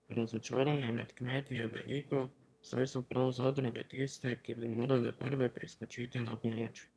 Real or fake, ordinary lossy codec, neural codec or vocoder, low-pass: fake; none; autoencoder, 22.05 kHz, a latent of 192 numbers a frame, VITS, trained on one speaker; none